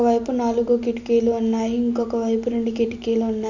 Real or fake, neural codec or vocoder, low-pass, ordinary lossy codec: real; none; 7.2 kHz; none